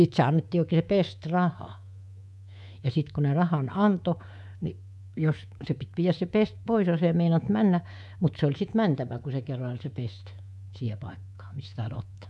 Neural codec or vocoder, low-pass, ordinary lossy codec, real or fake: codec, 24 kHz, 3.1 kbps, DualCodec; none; none; fake